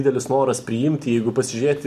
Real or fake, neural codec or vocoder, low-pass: real; none; 14.4 kHz